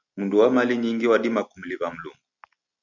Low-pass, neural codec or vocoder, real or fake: 7.2 kHz; none; real